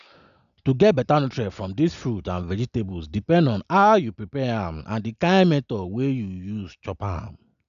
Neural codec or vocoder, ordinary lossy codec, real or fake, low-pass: none; none; real; 7.2 kHz